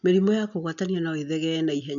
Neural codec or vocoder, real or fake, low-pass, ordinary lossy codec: none; real; 7.2 kHz; none